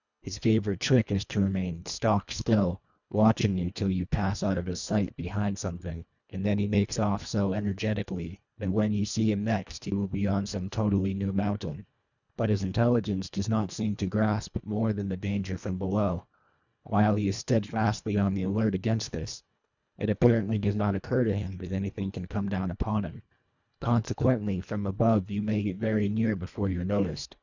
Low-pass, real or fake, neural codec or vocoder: 7.2 kHz; fake; codec, 24 kHz, 1.5 kbps, HILCodec